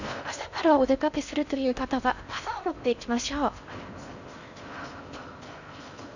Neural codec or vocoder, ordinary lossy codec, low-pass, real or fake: codec, 16 kHz in and 24 kHz out, 0.6 kbps, FocalCodec, streaming, 4096 codes; none; 7.2 kHz; fake